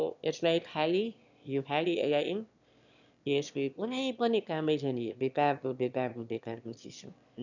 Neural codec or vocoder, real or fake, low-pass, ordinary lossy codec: autoencoder, 22.05 kHz, a latent of 192 numbers a frame, VITS, trained on one speaker; fake; 7.2 kHz; none